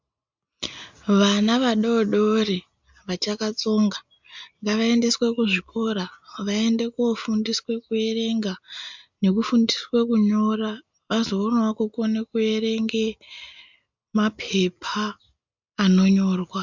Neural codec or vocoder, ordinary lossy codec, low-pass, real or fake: none; MP3, 64 kbps; 7.2 kHz; real